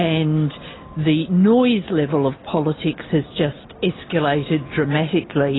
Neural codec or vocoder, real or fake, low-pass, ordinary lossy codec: none; real; 7.2 kHz; AAC, 16 kbps